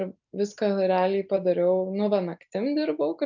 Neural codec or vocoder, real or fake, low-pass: none; real; 7.2 kHz